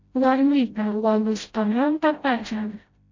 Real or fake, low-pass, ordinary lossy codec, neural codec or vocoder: fake; 7.2 kHz; MP3, 48 kbps; codec, 16 kHz, 0.5 kbps, FreqCodec, smaller model